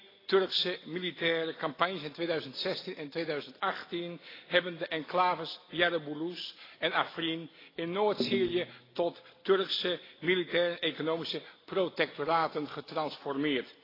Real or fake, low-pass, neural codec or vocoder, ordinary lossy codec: real; 5.4 kHz; none; AAC, 24 kbps